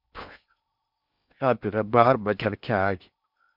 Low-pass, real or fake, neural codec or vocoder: 5.4 kHz; fake; codec, 16 kHz in and 24 kHz out, 0.6 kbps, FocalCodec, streaming, 4096 codes